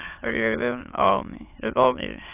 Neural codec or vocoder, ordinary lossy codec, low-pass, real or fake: autoencoder, 22.05 kHz, a latent of 192 numbers a frame, VITS, trained on many speakers; AAC, 32 kbps; 3.6 kHz; fake